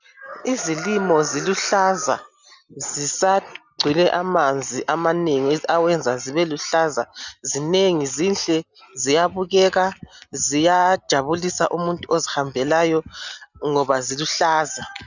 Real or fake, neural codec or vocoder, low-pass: real; none; 7.2 kHz